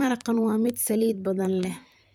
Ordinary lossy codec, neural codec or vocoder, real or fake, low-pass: none; vocoder, 44.1 kHz, 128 mel bands, Pupu-Vocoder; fake; none